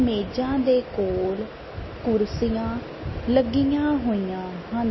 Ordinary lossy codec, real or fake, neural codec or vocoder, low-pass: MP3, 24 kbps; real; none; 7.2 kHz